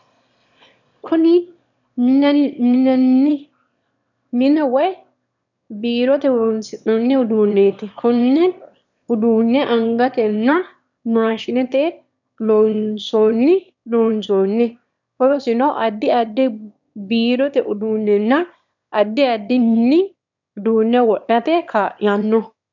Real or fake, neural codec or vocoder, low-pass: fake; autoencoder, 22.05 kHz, a latent of 192 numbers a frame, VITS, trained on one speaker; 7.2 kHz